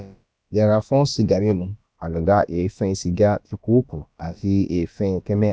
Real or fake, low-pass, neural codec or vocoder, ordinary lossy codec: fake; none; codec, 16 kHz, about 1 kbps, DyCAST, with the encoder's durations; none